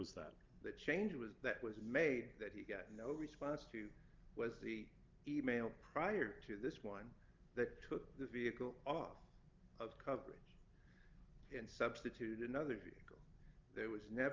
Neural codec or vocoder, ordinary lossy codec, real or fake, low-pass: none; Opus, 32 kbps; real; 7.2 kHz